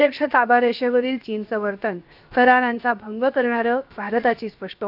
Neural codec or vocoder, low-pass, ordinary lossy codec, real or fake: codec, 16 kHz, 0.7 kbps, FocalCodec; 5.4 kHz; none; fake